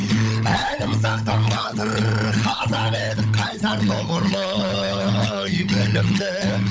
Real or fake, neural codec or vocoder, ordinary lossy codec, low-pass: fake; codec, 16 kHz, 16 kbps, FunCodec, trained on LibriTTS, 50 frames a second; none; none